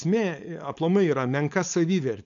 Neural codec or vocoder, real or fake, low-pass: codec, 16 kHz, 4.8 kbps, FACodec; fake; 7.2 kHz